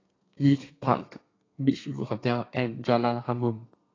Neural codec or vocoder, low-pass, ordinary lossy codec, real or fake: codec, 32 kHz, 1.9 kbps, SNAC; 7.2 kHz; AAC, 32 kbps; fake